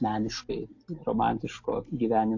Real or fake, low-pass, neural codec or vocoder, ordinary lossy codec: real; 7.2 kHz; none; AAC, 48 kbps